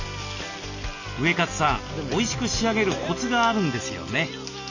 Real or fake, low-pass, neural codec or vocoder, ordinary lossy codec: real; 7.2 kHz; none; none